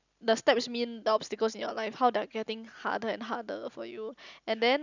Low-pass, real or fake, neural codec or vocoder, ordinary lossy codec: 7.2 kHz; real; none; none